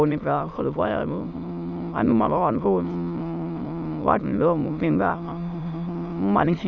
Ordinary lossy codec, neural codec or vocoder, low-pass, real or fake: none; autoencoder, 22.05 kHz, a latent of 192 numbers a frame, VITS, trained on many speakers; 7.2 kHz; fake